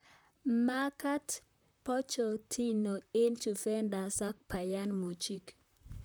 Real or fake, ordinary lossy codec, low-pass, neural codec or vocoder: fake; none; none; vocoder, 44.1 kHz, 128 mel bands, Pupu-Vocoder